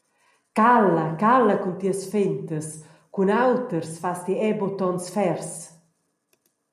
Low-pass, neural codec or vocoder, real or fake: 14.4 kHz; none; real